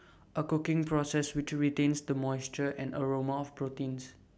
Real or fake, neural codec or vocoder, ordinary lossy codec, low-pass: real; none; none; none